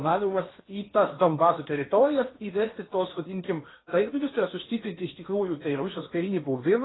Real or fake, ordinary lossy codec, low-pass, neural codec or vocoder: fake; AAC, 16 kbps; 7.2 kHz; codec, 16 kHz in and 24 kHz out, 0.8 kbps, FocalCodec, streaming, 65536 codes